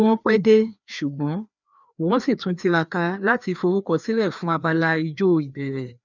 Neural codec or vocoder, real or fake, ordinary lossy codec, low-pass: codec, 16 kHz, 2 kbps, FreqCodec, larger model; fake; none; 7.2 kHz